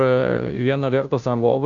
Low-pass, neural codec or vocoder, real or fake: 7.2 kHz; codec, 16 kHz, 0.5 kbps, FunCodec, trained on Chinese and English, 25 frames a second; fake